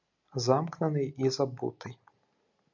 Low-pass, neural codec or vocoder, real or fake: 7.2 kHz; none; real